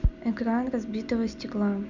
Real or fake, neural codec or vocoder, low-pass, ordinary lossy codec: real; none; 7.2 kHz; none